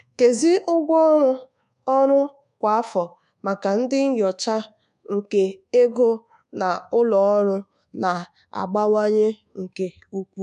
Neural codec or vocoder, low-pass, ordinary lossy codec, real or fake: codec, 24 kHz, 1.2 kbps, DualCodec; 10.8 kHz; none; fake